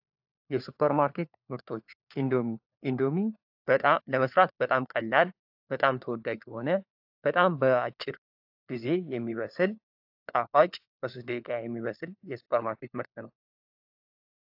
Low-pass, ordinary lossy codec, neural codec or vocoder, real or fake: 5.4 kHz; AAC, 48 kbps; codec, 16 kHz, 4 kbps, FunCodec, trained on LibriTTS, 50 frames a second; fake